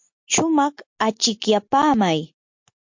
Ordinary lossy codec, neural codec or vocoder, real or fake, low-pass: MP3, 48 kbps; none; real; 7.2 kHz